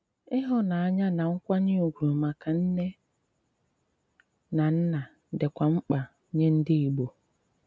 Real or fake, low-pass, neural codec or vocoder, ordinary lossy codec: real; none; none; none